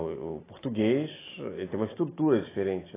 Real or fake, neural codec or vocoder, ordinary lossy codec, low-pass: real; none; AAC, 16 kbps; 3.6 kHz